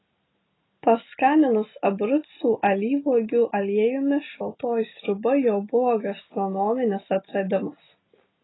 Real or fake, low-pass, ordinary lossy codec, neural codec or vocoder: real; 7.2 kHz; AAC, 16 kbps; none